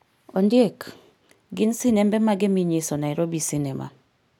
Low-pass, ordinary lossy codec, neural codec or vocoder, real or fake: 19.8 kHz; none; none; real